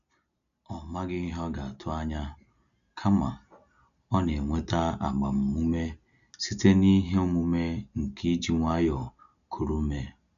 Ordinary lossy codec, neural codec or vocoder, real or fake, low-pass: none; none; real; 7.2 kHz